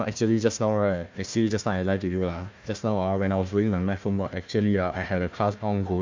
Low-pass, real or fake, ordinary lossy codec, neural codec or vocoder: 7.2 kHz; fake; MP3, 64 kbps; codec, 16 kHz, 1 kbps, FunCodec, trained on Chinese and English, 50 frames a second